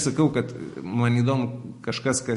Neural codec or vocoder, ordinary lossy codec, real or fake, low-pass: none; MP3, 48 kbps; real; 14.4 kHz